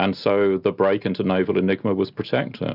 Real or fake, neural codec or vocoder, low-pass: real; none; 5.4 kHz